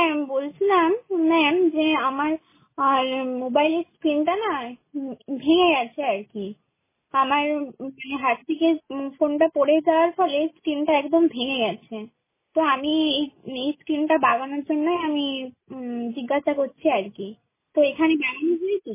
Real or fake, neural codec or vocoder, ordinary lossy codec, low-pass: real; none; MP3, 16 kbps; 3.6 kHz